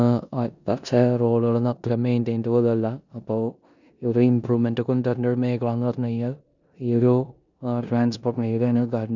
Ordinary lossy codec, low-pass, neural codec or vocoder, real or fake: none; 7.2 kHz; codec, 16 kHz in and 24 kHz out, 0.9 kbps, LongCat-Audio-Codec, four codebook decoder; fake